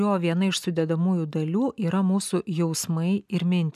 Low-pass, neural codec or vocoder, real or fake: 14.4 kHz; none; real